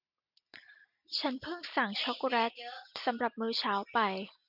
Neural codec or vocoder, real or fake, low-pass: none; real; 5.4 kHz